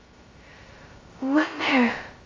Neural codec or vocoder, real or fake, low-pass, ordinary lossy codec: codec, 16 kHz, 0.2 kbps, FocalCodec; fake; 7.2 kHz; Opus, 32 kbps